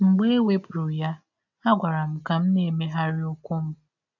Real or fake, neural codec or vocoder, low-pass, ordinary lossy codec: real; none; 7.2 kHz; none